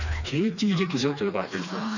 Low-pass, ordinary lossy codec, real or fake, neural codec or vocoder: 7.2 kHz; none; fake; codec, 16 kHz, 2 kbps, FreqCodec, smaller model